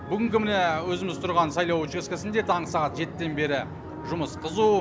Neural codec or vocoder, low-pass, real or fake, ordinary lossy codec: none; none; real; none